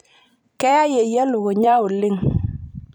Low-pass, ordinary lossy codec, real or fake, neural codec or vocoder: 19.8 kHz; none; fake; vocoder, 44.1 kHz, 128 mel bands every 256 samples, BigVGAN v2